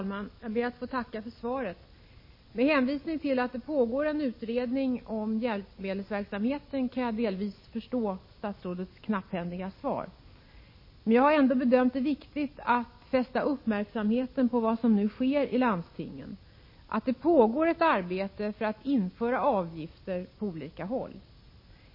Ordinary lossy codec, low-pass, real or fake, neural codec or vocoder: MP3, 24 kbps; 5.4 kHz; real; none